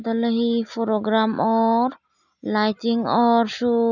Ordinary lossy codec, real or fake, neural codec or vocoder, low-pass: none; real; none; 7.2 kHz